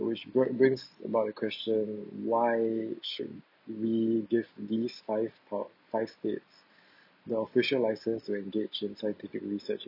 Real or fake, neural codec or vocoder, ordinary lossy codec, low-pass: real; none; none; 5.4 kHz